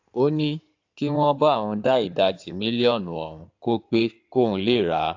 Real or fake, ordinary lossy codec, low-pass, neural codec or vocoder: fake; AAC, 48 kbps; 7.2 kHz; codec, 16 kHz in and 24 kHz out, 2.2 kbps, FireRedTTS-2 codec